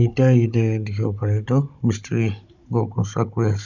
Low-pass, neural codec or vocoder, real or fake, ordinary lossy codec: 7.2 kHz; codec, 16 kHz, 16 kbps, FunCodec, trained on Chinese and English, 50 frames a second; fake; none